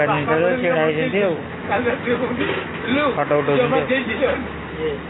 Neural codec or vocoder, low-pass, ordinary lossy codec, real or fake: none; 7.2 kHz; AAC, 16 kbps; real